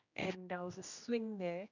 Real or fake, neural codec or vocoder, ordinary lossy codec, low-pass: fake; codec, 16 kHz, 1 kbps, X-Codec, HuBERT features, trained on general audio; none; 7.2 kHz